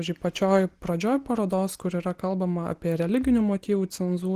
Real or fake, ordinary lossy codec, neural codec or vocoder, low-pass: real; Opus, 16 kbps; none; 14.4 kHz